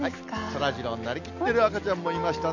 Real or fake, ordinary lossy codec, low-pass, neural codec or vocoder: real; none; 7.2 kHz; none